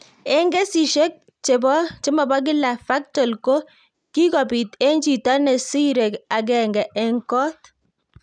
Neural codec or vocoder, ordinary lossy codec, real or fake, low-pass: none; none; real; 9.9 kHz